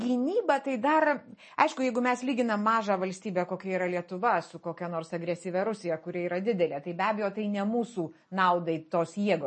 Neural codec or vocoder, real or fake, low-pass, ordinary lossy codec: none; real; 10.8 kHz; MP3, 32 kbps